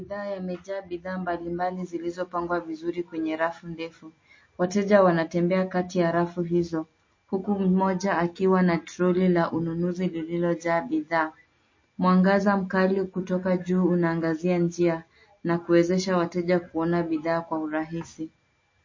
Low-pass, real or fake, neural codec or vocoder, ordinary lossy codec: 7.2 kHz; real; none; MP3, 32 kbps